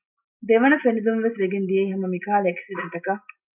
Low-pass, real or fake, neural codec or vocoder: 3.6 kHz; real; none